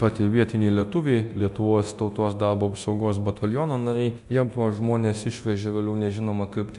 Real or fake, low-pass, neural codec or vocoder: fake; 10.8 kHz; codec, 24 kHz, 0.9 kbps, DualCodec